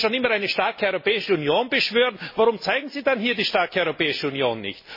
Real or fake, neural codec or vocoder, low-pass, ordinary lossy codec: real; none; 5.4 kHz; MP3, 24 kbps